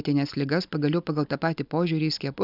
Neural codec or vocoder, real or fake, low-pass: none; real; 5.4 kHz